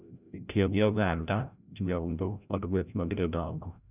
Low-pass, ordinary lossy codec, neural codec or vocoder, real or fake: 3.6 kHz; none; codec, 16 kHz, 0.5 kbps, FreqCodec, larger model; fake